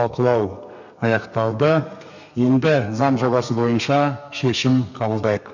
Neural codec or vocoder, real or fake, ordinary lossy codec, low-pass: codec, 32 kHz, 1.9 kbps, SNAC; fake; none; 7.2 kHz